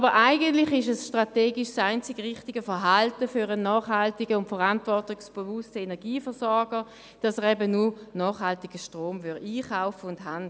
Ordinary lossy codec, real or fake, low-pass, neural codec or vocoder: none; real; none; none